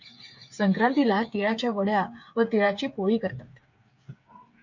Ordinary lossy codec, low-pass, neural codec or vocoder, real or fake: MP3, 48 kbps; 7.2 kHz; codec, 16 kHz, 8 kbps, FreqCodec, smaller model; fake